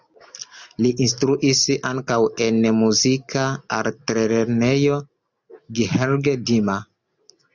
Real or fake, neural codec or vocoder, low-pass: real; none; 7.2 kHz